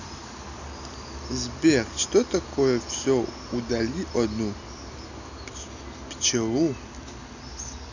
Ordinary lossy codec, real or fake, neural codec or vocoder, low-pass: none; real; none; 7.2 kHz